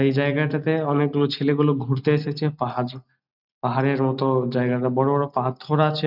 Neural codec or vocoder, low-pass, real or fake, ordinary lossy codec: none; 5.4 kHz; real; none